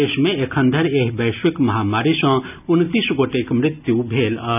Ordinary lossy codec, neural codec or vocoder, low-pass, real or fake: none; none; 3.6 kHz; real